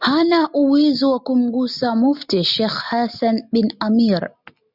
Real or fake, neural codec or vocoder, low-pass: real; none; 5.4 kHz